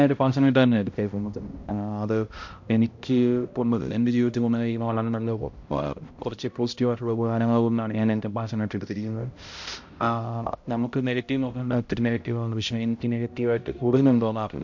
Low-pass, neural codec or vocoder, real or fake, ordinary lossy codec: 7.2 kHz; codec, 16 kHz, 0.5 kbps, X-Codec, HuBERT features, trained on balanced general audio; fake; MP3, 48 kbps